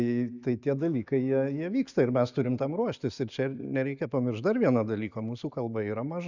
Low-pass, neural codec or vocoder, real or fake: 7.2 kHz; none; real